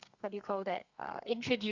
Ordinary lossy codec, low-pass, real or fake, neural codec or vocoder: Opus, 64 kbps; 7.2 kHz; fake; codec, 32 kHz, 1.9 kbps, SNAC